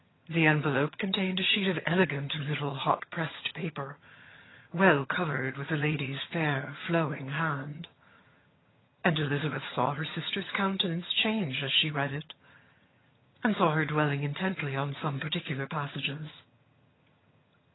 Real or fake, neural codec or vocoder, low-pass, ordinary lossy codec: fake; vocoder, 22.05 kHz, 80 mel bands, HiFi-GAN; 7.2 kHz; AAC, 16 kbps